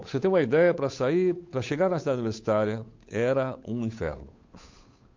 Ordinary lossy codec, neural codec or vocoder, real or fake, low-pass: MP3, 48 kbps; codec, 16 kHz, 8 kbps, FunCodec, trained on Chinese and English, 25 frames a second; fake; 7.2 kHz